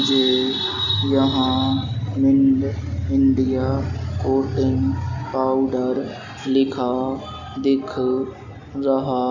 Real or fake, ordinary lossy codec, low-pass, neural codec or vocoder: real; none; 7.2 kHz; none